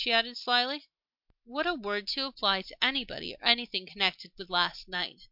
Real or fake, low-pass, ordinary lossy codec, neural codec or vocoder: real; 5.4 kHz; MP3, 48 kbps; none